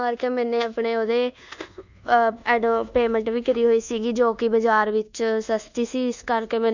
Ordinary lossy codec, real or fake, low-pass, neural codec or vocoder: none; fake; 7.2 kHz; codec, 24 kHz, 1.2 kbps, DualCodec